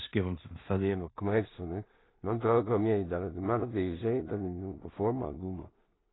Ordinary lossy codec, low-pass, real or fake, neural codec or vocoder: AAC, 16 kbps; 7.2 kHz; fake; codec, 16 kHz in and 24 kHz out, 0.4 kbps, LongCat-Audio-Codec, two codebook decoder